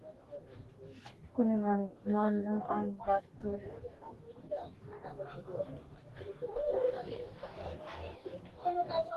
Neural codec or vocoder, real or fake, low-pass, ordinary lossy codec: codec, 44.1 kHz, 2.6 kbps, DAC; fake; 14.4 kHz; Opus, 16 kbps